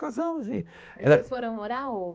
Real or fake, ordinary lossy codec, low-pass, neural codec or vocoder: fake; none; none; codec, 16 kHz, 2 kbps, X-Codec, HuBERT features, trained on balanced general audio